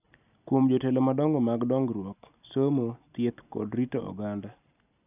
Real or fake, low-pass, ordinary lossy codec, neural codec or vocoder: real; 3.6 kHz; none; none